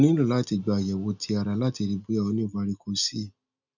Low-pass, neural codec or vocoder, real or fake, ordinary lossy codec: 7.2 kHz; none; real; none